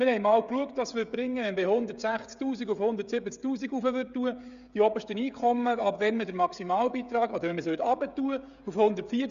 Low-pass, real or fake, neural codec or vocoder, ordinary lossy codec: 7.2 kHz; fake; codec, 16 kHz, 16 kbps, FreqCodec, smaller model; Opus, 64 kbps